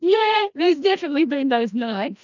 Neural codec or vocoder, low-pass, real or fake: codec, 16 kHz, 1 kbps, FreqCodec, larger model; 7.2 kHz; fake